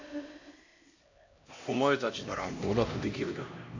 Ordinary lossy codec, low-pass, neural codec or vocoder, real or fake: AAC, 48 kbps; 7.2 kHz; codec, 16 kHz, 1 kbps, X-Codec, HuBERT features, trained on LibriSpeech; fake